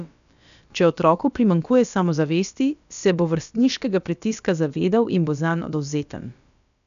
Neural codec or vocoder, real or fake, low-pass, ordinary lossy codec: codec, 16 kHz, about 1 kbps, DyCAST, with the encoder's durations; fake; 7.2 kHz; none